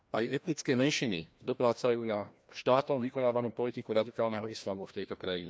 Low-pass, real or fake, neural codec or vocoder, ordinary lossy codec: none; fake; codec, 16 kHz, 1 kbps, FreqCodec, larger model; none